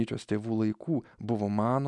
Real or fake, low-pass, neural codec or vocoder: real; 10.8 kHz; none